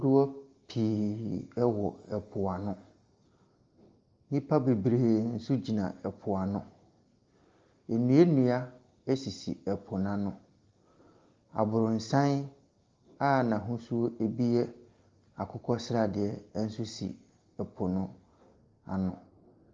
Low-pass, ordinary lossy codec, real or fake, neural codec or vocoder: 7.2 kHz; Opus, 32 kbps; real; none